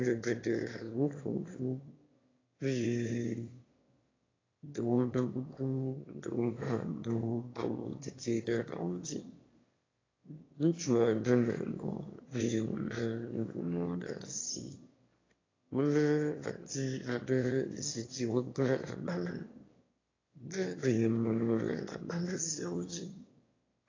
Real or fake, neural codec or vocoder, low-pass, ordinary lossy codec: fake; autoencoder, 22.05 kHz, a latent of 192 numbers a frame, VITS, trained on one speaker; 7.2 kHz; AAC, 32 kbps